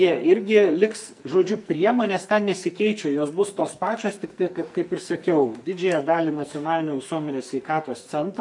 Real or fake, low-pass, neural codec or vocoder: fake; 10.8 kHz; codec, 44.1 kHz, 2.6 kbps, SNAC